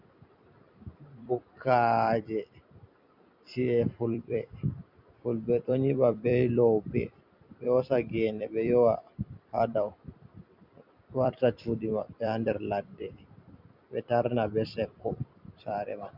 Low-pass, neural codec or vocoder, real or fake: 5.4 kHz; vocoder, 44.1 kHz, 128 mel bands every 256 samples, BigVGAN v2; fake